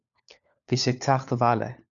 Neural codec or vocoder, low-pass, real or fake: codec, 16 kHz, 4 kbps, FunCodec, trained on LibriTTS, 50 frames a second; 7.2 kHz; fake